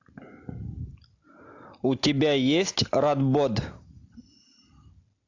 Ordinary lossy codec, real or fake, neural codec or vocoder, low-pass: AAC, 48 kbps; real; none; 7.2 kHz